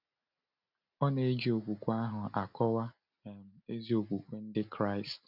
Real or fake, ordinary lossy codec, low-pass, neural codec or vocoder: real; none; 5.4 kHz; none